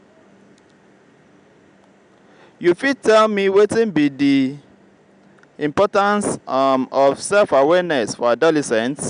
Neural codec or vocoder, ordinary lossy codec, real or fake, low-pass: none; none; real; 9.9 kHz